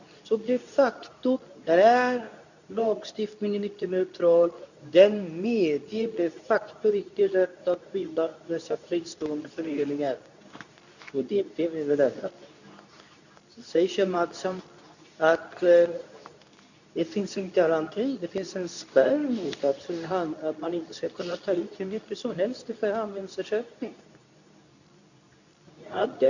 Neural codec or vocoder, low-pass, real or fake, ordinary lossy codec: codec, 24 kHz, 0.9 kbps, WavTokenizer, medium speech release version 2; 7.2 kHz; fake; none